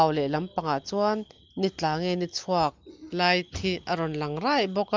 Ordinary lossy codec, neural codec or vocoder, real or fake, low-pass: Opus, 32 kbps; none; real; 7.2 kHz